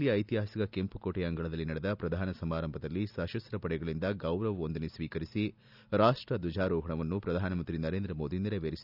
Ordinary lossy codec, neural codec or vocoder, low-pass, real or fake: none; none; 5.4 kHz; real